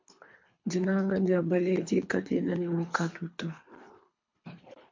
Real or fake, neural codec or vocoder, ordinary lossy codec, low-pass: fake; codec, 24 kHz, 3 kbps, HILCodec; MP3, 48 kbps; 7.2 kHz